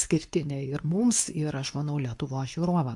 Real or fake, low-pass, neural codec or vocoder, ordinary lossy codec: fake; 10.8 kHz; codec, 24 kHz, 0.9 kbps, WavTokenizer, medium speech release version 2; AAC, 64 kbps